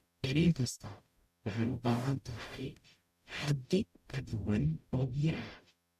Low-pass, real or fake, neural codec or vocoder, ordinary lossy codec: 14.4 kHz; fake; codec, 44.1 kHz, 0.9 kbps, DAC; AAC, 96 kbps